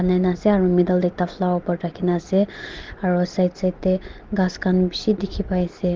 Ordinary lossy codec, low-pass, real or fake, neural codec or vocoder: Opus, 16 kbps; 7.2 kHz; real; none